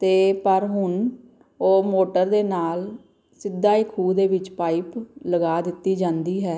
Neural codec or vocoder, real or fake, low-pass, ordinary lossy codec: none; real; none; none